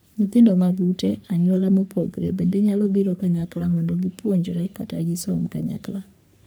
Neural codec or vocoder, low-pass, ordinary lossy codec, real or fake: codec, 44.1 kHz, 3.4 kbps, Pupu-Codec; none; none; fake